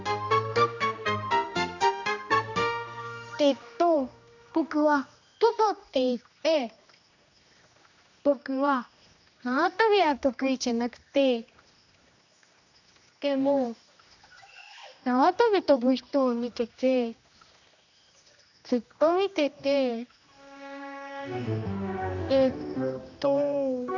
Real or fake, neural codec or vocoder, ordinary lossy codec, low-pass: fake; codec, 16 kHz, 1 kbps, X-Codec, HuBERT features, trained on general audio; none; 7.2 kHz